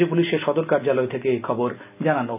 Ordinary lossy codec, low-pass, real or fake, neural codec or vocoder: none; 3.6 kHz; real; none